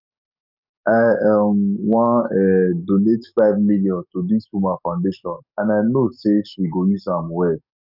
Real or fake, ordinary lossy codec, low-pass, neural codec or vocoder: fake; none; 5.4 kHz; codec, 44.1 kHz, 7.8 kbps, DAC